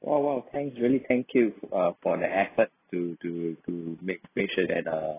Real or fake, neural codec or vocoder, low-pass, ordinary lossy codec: real; none; 3.6 kHz; AAC, 16 kbps